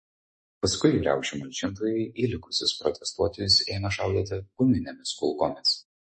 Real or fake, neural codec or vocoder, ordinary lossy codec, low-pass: fake; autoencoder, 48 kHz, 128 numbers a frame, DAC-VAE, trained on Japanese speech; MP3, 32 kbps; 10.8 kHz